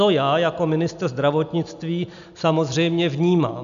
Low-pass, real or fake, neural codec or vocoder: 7.2 kHz; real; none